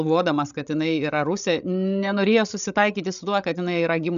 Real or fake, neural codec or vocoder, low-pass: fake; codec, 16 kHz, 16 kbps, FreqCodec, larger model; 7.2 kHz